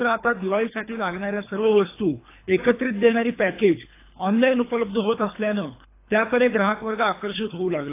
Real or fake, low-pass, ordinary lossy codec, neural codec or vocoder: fake; 3.6 kHz; AAC, 24 kbps; codec, 24 kHz, 3 kbps, HILCodec